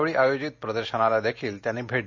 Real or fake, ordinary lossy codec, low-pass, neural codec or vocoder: real; MP3, 48 kbps; 7.2 kHz; none